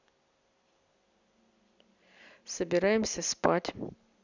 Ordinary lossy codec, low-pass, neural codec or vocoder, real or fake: none; 7.2 kHz; none; real